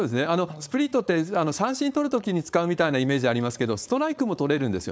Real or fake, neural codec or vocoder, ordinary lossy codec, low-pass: fake; codec, 16 kHz, 4.8 kbps, FACodec; none; none